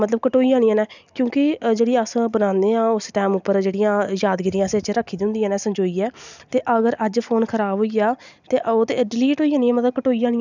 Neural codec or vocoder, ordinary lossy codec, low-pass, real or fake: none; none; 7.2 kHz; real